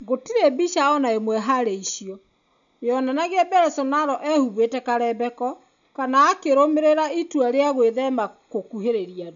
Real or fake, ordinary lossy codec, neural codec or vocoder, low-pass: real; none; none; 7.2 kHz